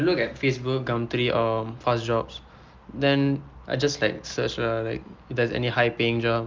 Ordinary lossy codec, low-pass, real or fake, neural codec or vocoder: Opus, 24 kbps; 7.2 kHz; real; none